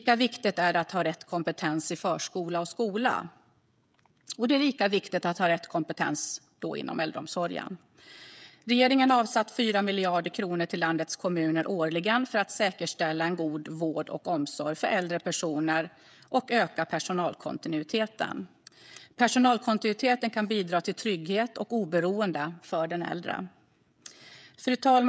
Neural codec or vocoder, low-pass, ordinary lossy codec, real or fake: codec, 16 kHz, 16 kbps, FreqCodec, smaller model; none; none; fake